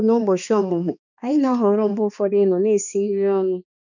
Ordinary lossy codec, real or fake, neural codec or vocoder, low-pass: none; fake; codec, 16 kHz, 2 kbps, X-Codec, HuBERT features, trained on balanced general audio; 7.2 kHz